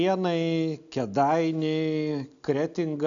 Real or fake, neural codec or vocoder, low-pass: real; none; 7.2 kHz